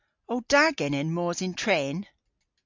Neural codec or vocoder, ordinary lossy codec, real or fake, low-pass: none; MP3, 64 kbps; real; 7.2 kHz